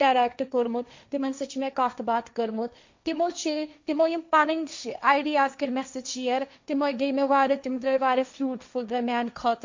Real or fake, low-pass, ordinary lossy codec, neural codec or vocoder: fake; 7.2 kHz; MP3, 64 kbps; codec, 16 kHz, 1.1 kbps, Voila-Tokenizer